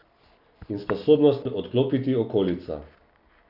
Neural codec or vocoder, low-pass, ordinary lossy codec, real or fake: none; 5.4 kHz; none; real